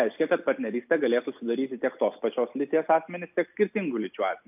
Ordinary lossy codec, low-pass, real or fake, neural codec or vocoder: AAC, 32 kbps; 3.6 kHz; real; none